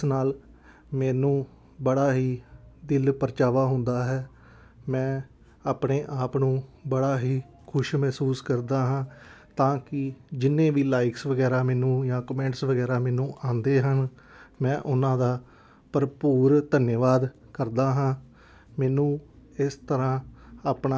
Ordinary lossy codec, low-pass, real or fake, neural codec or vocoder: none; none; real; none